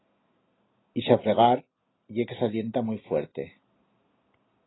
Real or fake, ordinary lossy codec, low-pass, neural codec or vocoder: real; AAC, 16 kbps; 7.2 kHz; none